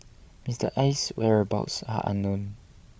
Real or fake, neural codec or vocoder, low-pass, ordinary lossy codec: fake; codec, 16 kHz, 16 kbps, FreqCodec, larger model; none; none